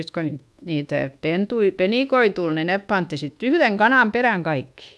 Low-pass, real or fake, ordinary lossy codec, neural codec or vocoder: none; fake; none; codec, 24 kHz, 1.2 kbps, DualCodec